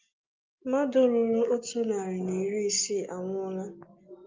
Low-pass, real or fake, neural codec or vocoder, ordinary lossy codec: 7.2 kHz; real; none; Opus, 24 kbps